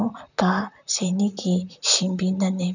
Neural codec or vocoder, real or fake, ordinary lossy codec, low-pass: vocoder, 44.1 kHz, 128 mel bands, Pupu-Vocoder; fake; none; 7.2 kHz